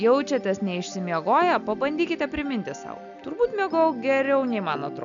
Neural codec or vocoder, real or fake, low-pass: none; real; 7.2 kHz